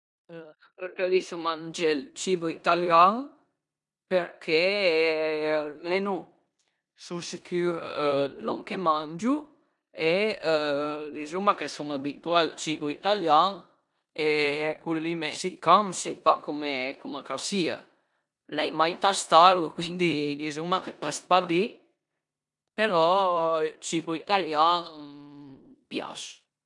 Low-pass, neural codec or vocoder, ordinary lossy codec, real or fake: 10.8 kHz; codec, 16 kHz in and 24 kHz out, 0.9 kbps, LongCat-Audio-Codec, four codebook decoder; none; fake